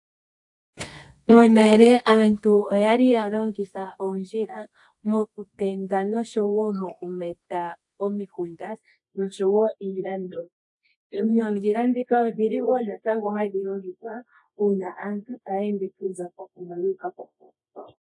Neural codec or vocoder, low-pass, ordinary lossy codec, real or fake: codec, 24 kHz, 0.9 kbps, WavTokenizer, medium music audio release; 10.8 kHz; AAC, 64 kbps; fake